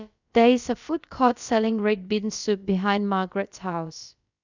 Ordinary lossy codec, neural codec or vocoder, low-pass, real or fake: none; codec, 16 kHz, about 1 kbps, DyCAST, with the encoder's durations; 7.2 kHz; fake